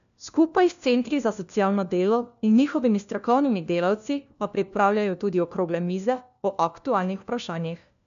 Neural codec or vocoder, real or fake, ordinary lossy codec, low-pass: codec, 16 kHz, 1 kbps, FunCodec, trained on LibriTTS, 50 frames a second; fake; MP3, 96 kbps; 7.2 kHz